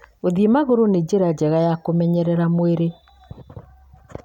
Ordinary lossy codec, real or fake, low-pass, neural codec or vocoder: none; real; 19.8 kHz; none